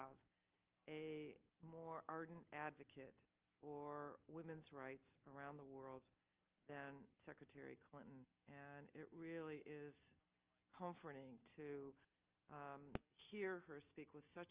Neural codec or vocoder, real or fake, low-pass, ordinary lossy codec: none; real; 3.6 kHz; Opus, 24 kbps